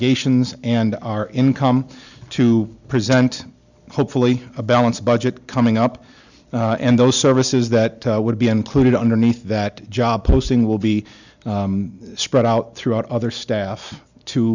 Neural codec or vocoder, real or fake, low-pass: none; real; 7.2 kHz